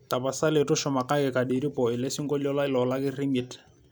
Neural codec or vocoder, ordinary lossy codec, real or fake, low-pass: none; none; real; none